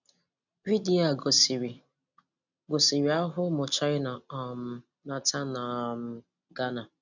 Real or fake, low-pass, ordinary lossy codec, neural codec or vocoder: real; 7.2 kHz; none; none